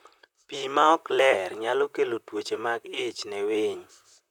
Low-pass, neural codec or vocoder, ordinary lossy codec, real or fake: 19.8 kHz; vocoder, 44.1 kHz, 128 mel bands, Pupu-Vocoder; none; fake